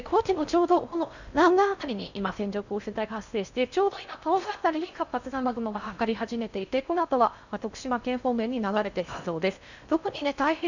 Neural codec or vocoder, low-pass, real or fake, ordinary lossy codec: codec, 16 kHz in and 24 kHz out, 0.6 kbps, FocalCodec, streaming, 4096 codes; 7.2 kHz; fake; none